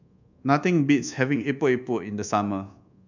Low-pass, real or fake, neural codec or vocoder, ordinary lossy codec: 7.2 kHz; fake; codec, 24 kHz, 1.2 kbps, DualCodec; none